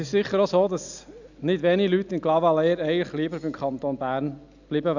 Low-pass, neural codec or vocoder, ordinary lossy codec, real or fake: 7.2 kHz; none; none; real